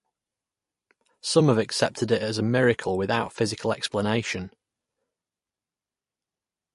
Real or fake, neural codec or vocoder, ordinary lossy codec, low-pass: fake; vocoder, 44.1 kHz, 128 mel bands every 256 samples, BigVGAN v2; MP3, 48 kbps; 14.4 kHz